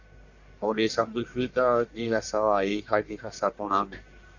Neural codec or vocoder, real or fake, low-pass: codec, 44.1 kHz, 1.7 kbps, Pupu-Codec; fake; 7.2 kHz